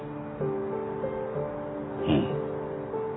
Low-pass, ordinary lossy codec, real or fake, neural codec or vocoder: 7.2 kHz; AAC, 16 kbps; real; none